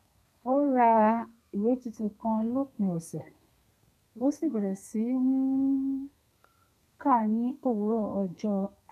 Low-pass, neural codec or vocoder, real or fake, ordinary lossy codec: 14.4 kHz; codec, 32 kHz, 1.9 kbps, SNAC; fake; none